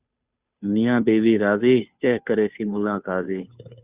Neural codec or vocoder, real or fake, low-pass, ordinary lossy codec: codec, 16 kHz, 2 kbps, FunCodec, trained on Chinese and English, 25 frames a second; fake; 3.6 kHz; Opus, 64 kbps